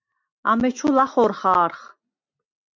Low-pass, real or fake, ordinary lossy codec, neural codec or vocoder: 7.2 kHz; real; MP3, 48 kbps; none